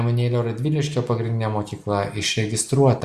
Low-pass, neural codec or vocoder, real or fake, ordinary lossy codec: 14.4 kHz; none; real; Opus, 64 kbps